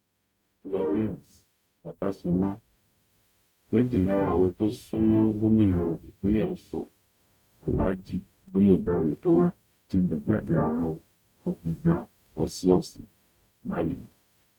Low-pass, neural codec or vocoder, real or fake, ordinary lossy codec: 19.8 kHz; codec, 44.1 kHz, 0.9 kbps, DAC; fake; none